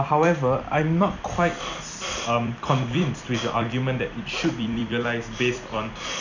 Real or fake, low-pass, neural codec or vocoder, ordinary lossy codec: fake; 7.2 kHz; vocoder, 44.1 kHz, 128 mel bands every 256 samples, BigVGAN v2; none